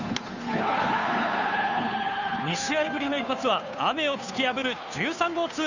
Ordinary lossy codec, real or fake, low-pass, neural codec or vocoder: none; fake; 7.2 kHz; codec, 16 kHz, 2 kbps, FunCodec, trained on Chinese and English, 25 frames a second